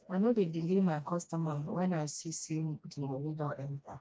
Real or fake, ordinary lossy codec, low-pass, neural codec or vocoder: fake; none; none; codec, 16 kHz, 1 kbps, FreqCodec, smaller model